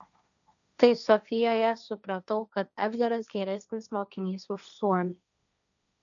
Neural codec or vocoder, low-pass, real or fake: codec, 16 kHz, 1.1 kbps, Voila-Tokenizer; 7.2 kHz; fake